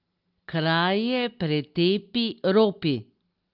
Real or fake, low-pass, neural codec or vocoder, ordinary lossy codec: real; 5.4 kHz; none; Opus, 32 kbps